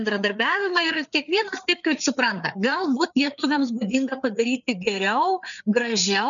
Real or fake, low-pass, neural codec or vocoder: fake; 7.2 kHz; codec, 16 kHz, 4 kbps, FreqCodec, larger model